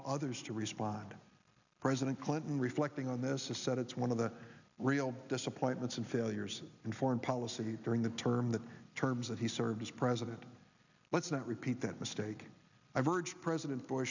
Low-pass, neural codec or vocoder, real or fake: 7.2 kHz; none; real